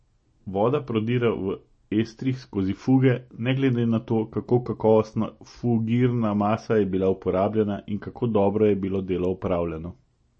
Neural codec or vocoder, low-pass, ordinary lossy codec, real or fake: none; 9.9 kHz; MP3, 32 kbps; real